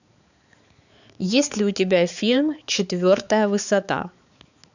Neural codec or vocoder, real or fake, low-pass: codec, 16 kHz, 4 kbps, X-Codec, HuBERT features, trained on balanced general audio; fake; 7.2 kHz